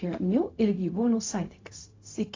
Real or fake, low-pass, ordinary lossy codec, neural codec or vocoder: fake; 7.2 kHz; AAC, 32 kbps; codec, 16 kHz, 0.4 kbps, LongCat-Audio-Codec